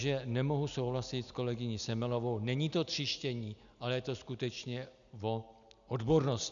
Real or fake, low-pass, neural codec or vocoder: real; 7.2 kHz; none